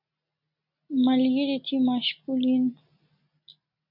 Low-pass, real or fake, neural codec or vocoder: 5.4 kHz; real; none